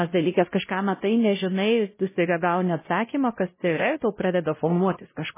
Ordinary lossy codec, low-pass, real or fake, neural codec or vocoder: MP3, 16 kbps; 3.6 kHz; fake; codec, 16 kHz, 0.5 kbps, X-Codec, WavLM features, trained on Multilingual LibriSpeech